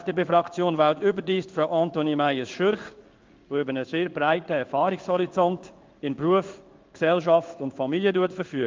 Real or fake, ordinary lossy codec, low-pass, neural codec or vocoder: fake; Opus, 24 kbps; 7.2 kHz; codec, 16 kHz in and 24 kHz out, 1 kbps, XY-Tokenizer